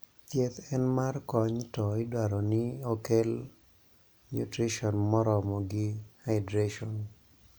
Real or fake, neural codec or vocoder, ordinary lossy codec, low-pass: real; none; none; none